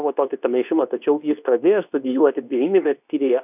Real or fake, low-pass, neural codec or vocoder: fake; 3.6 kHz; codec, 24 kHz, 0.9 kbps, WavTokenizer, medium speech release version 2